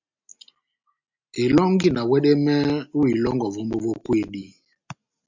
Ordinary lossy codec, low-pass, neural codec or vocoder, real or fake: MP3, 64 kbps; 7.2 kHz; none; real